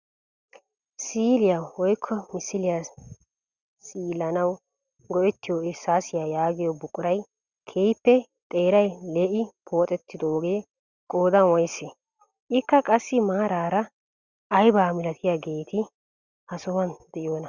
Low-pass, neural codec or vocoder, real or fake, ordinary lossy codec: 7.2 kHz; none; real; Opus, 64 kbps